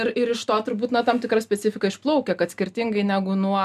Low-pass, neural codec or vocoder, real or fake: 14.4 kHz; none; real